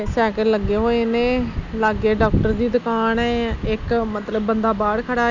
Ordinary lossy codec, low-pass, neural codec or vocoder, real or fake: none; 7.2 kHz; none; real